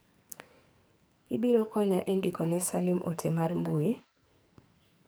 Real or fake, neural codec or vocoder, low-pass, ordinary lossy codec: fake; codec, 44.1 kHz, 2.6 kbps, SNAC; none; none